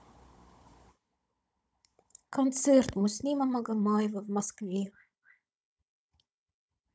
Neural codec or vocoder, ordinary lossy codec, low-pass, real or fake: codec, 16 kHz, 16 kbps, FunCodec, trained on Chinese and English, 50 frames a second; none; none; fake